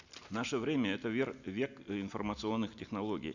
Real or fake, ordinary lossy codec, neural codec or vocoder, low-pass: real; none; none; 7.2 kHz